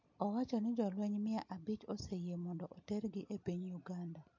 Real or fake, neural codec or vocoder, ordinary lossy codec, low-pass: real; none; MP3, 48 kbps; 7.2 kHz